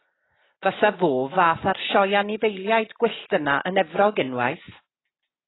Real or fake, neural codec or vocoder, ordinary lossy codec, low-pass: real; none; AAC, 16 kbps; 7.2 kHz